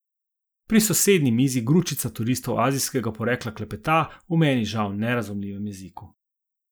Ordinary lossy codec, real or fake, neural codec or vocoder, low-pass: none; real; none; none